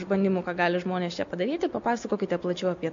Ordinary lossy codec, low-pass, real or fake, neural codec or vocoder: MP3, 48 kbps; 7.2 kHz; real; none